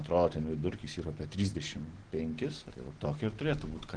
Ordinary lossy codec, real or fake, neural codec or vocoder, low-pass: Opus, 16 kbps; real; none; 9.9 kHz